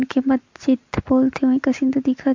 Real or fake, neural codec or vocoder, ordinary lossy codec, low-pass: real; none; MP3, 48 kbps; 7.2 kHz